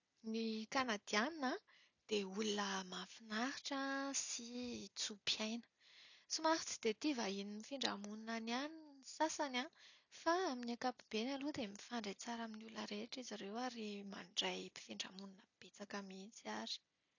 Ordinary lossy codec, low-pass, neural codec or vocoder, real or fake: none; 7.2 kHz; none; real